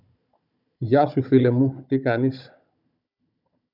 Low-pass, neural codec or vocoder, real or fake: 5.4 kHz; codec, 16 kHz, 16 kbps, FunCodec, trained on Chinese and English, 50 frames a second; fake